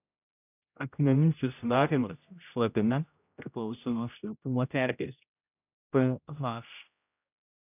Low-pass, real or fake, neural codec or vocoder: 3.6 kHz; fake; codec, 16 kHz, 0.5 kbps, X-Codec, HuBERT features, trained on general audio